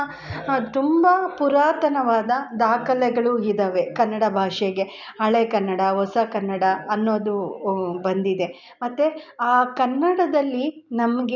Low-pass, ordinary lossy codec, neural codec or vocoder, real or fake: 7.2 kHz; none; none; real